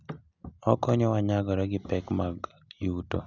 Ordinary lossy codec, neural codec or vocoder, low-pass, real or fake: none; none; 7.2 kHz; real